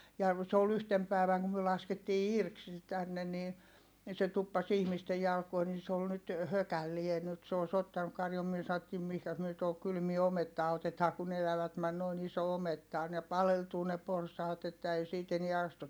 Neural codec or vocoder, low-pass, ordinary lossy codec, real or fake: none; none; none; real